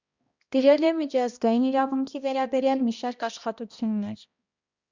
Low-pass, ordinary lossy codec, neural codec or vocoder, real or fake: 7.2 kHz; Opus, 64 kbps; codec, 16 kHz, 1 kbps, X-Codec, HuBERT features, trained on balanced general audio; fake